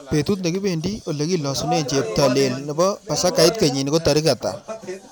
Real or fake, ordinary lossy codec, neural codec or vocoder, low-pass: real; none; none; none